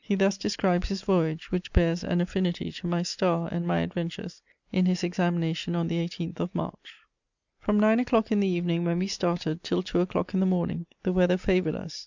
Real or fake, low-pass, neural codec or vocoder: real; 7.2 kHz; none